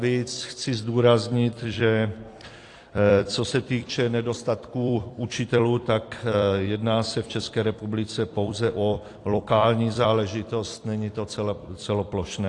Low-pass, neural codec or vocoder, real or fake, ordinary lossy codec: 10.8 kHz; vocoder, 44.1 kHz, 128 mel bands every 256 samples, BigVGAN v2; fake; AAC, 48 kbps